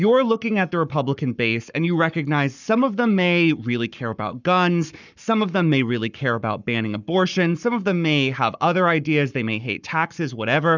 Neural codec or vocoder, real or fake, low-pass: codec, 44.1 kHz, 7.8 kbps, Pupu-Codec; fake; 7.2 kHz